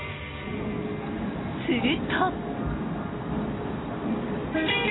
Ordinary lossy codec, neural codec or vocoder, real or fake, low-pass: AAC, 16 kbps; none; real; 7.2 kHz